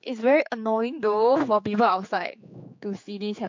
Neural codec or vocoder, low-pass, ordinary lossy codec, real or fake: codec, 16 kHz, 4 kbps, X-Codec, HuBERT features, trained on general audio; 7.2 kHz; MP3, 48 kbps; fake